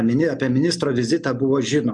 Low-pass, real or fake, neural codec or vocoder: 10.8 kHz; real; none